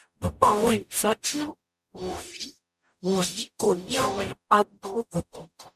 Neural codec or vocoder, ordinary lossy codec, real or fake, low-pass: codec, 44.1 kHz, 0.9 kbps, DAC; MP3, 96 kbps; fake; 14.4 kHz